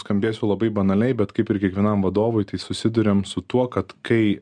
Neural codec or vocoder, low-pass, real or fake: none; 9.9 kHz; real